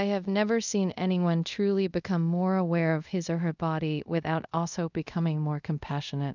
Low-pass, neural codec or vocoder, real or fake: 7.2 kHz; codec, 24 kHz, 0.5 kbps, DualCodec; fake